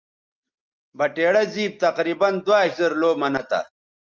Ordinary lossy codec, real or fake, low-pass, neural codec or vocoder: Opus, 24 kbps; real; 7.2 kHz; none